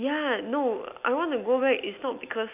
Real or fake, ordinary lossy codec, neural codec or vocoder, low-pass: real; none; none; 3.6 kHz